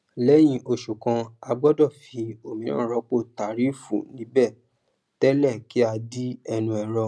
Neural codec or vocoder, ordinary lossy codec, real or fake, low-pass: none; none; real; none